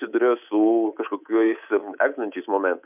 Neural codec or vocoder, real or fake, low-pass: codec, 24 kHz, 3.1 kbps, DualCodec; fake; 3.6 kHz